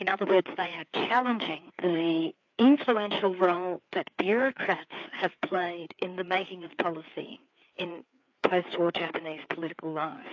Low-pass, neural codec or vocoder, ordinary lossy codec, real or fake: 7.2 kHz; codec, 16 kHz, 4 kbps, FreqCodec, larger model; AAC, 48 kbps; fake